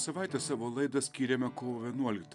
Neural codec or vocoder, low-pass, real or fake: none; 10.8 kHz; real